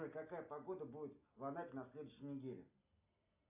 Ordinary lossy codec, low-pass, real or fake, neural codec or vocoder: AAC, 32 kbps; 3.6 kHz; real; none